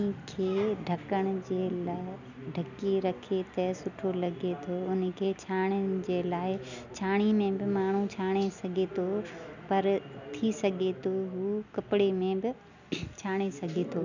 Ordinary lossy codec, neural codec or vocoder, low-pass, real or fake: none; none; 7.2 kHz; real